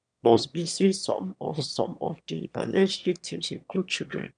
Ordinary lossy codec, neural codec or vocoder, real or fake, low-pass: none; autoencoder, 22.05 kHz, a latent of 192 numbers a frame, VITS, trained on one speaker; fake; 9.9 kHz